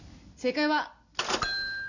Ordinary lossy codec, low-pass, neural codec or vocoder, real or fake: none; 7.2 kHz; none; real